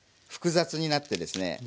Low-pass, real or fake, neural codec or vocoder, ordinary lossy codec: none; real; none; none